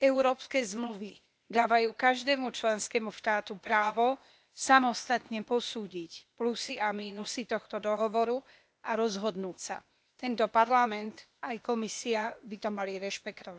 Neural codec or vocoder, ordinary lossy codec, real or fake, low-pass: codec, 16 kHz, 0.8 kbps, ZipCodec; none; fake; none